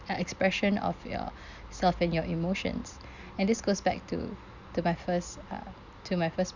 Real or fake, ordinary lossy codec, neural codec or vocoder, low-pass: real; none; none; 7.2 kHz